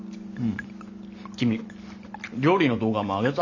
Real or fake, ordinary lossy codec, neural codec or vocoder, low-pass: real; none; none; 7.2 kHz